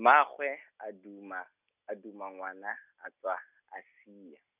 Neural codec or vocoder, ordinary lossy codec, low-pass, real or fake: none; none; 3.6 kHz; real